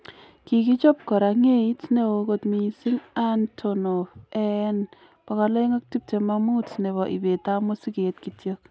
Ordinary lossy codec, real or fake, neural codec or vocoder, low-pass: none; real; none; none